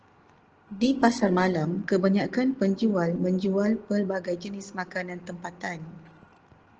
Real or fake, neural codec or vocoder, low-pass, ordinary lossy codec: real; none; 7.2 kHz; Opus, 16 kbps